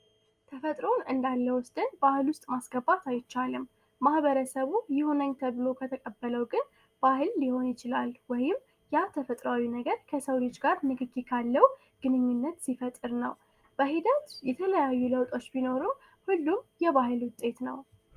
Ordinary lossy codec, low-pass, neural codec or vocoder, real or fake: Opus, 32 kbps; 14.4 kHz; none; real